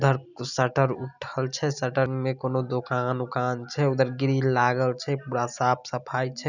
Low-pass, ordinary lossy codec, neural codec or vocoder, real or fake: 7.2 kHz; none; none; real